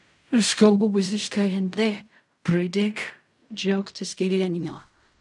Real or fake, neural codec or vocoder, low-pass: fake; codec, 16 kHz in and 24 kHz out, 0.4 kbps, LongCat-Audio-Codec, fine tuned four codebook decoder; 10.8 kHz